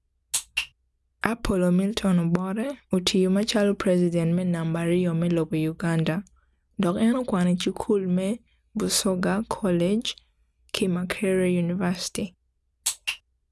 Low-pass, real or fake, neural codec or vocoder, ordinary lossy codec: none; real; none; none